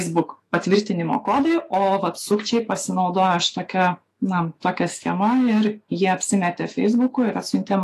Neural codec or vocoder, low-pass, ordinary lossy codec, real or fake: autoencoder, 48 kHz, 128 numbers a frame, DAC-VAE, trained on Japanese speech; 14.4 kHz; AAC, 48 kbps; fake